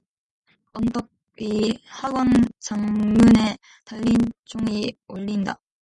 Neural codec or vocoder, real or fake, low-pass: none; real; 10.8 kHz